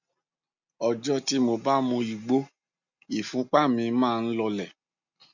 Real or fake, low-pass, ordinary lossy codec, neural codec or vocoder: real; 7.2 kHz; none; none